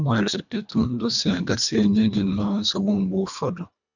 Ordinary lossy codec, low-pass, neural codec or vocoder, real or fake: none; 7.2 kHz; codec, 24 kHz, 1.5 kbps, HILCodec; fake